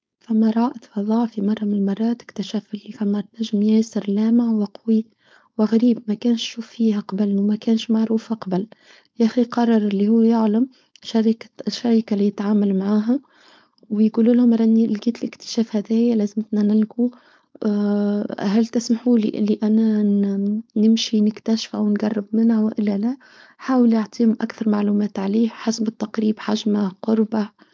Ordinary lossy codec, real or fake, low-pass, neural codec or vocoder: none; fake; none; codec, 16 kHz, 4.8 kbps, FACodec